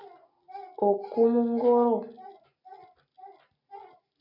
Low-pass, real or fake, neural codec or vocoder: 5.4 kHz; real; none